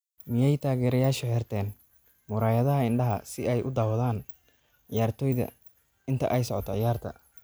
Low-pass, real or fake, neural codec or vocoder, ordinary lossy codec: none; real; none; none